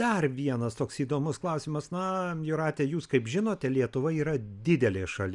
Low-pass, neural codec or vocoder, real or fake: 10.8 kHz; none; real